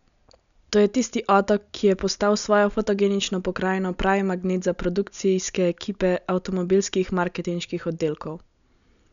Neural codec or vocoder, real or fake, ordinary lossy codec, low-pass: none; real; none; 7.2 kHz